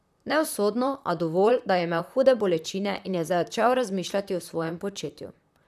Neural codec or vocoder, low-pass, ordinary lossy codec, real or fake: vocoder, 44.1 kHz, 128 mel bands, Pupu-Vocoder; 14.4 kHz; none; fake